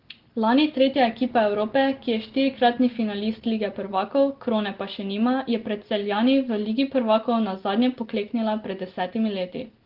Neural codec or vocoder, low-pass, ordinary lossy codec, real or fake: none; 5.4 kHz; Opus, 16 kbps; real